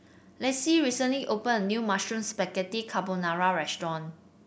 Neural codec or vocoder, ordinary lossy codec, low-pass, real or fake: none; none; none; real